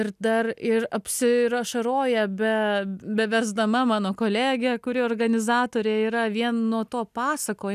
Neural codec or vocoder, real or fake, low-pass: none; real; 14.4 kHz